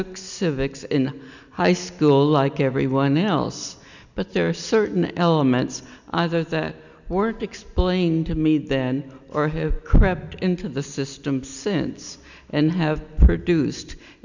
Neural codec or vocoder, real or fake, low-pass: none; real; 7.2 kHz